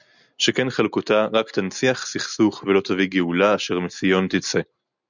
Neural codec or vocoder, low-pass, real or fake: none; 7.2 kHz; real